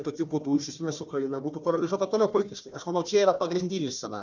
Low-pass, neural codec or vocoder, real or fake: 7.2 kHz; codec, 16 kHz, 1 kbps, FunCodec, trained on Chinese and English, 50 frames a second; fake